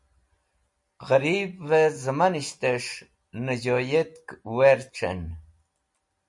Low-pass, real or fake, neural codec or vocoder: 10.8 kHz; real; none